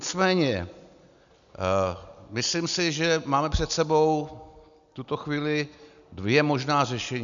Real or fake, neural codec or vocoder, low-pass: real; none; 7.2 kHz